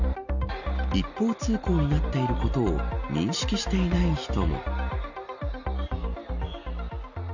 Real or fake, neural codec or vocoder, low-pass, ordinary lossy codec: real; none; 7.2 kHz; none